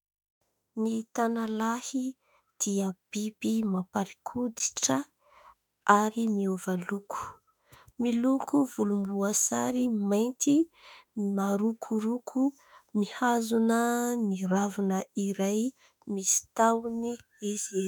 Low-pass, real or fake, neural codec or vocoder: 19.8 kHz; fake; autoencoder, 48 kHz, 32 numbers a frame, DAC-VAE, trained on Japanese speech